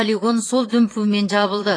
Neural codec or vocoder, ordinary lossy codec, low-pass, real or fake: vocoder, 22.05 kHz, 80 mel bands, Vocos; AAC, 32 kbps; 9.9 kHz; fake